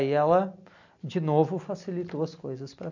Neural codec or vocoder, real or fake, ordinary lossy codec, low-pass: none; real; MP3, 48 kbps; 7.2 kHz